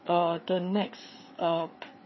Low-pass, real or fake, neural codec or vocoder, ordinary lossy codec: 7.2 kHz; fake; codec, 16 kHz, 4 kbps, FreqCodec, larger model; MP3, 24 kbps